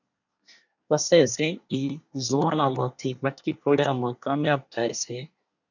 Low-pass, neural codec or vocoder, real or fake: 7.2 kHz; codec, 24 kHz, 1 kbps, SNAC; fake